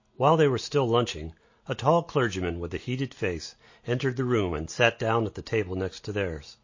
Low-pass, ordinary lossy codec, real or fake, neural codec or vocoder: 7.2 kHz; MP3, 32 kbps; real; none